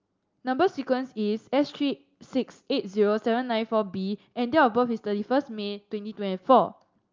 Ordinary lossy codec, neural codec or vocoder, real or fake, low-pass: Opus, 24 kbps; none; real; 7.2 kHz